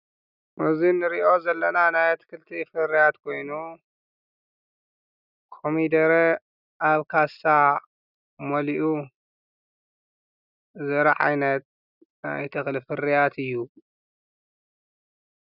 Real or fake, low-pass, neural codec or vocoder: real; 5.4 kHz; none